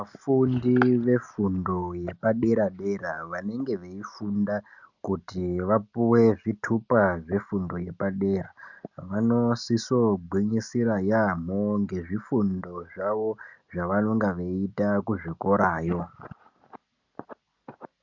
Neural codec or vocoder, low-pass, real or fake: none; 7.2 kHz; real